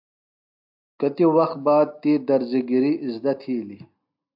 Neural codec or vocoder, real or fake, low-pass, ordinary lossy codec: none; real; 5.4 kHz; AAC, 48 kbps